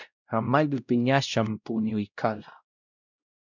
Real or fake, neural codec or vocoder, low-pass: fake; codec, 16 kHz, 0.5 kbps, X-Codec, WavLM features, trained on Multilingual LibriSpeech; 7.2 kHz